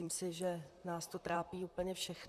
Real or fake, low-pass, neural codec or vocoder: fake; 14.4 kHz; vocoder, 44.1 kHz, 128 mel bands, Pupu-Vocoder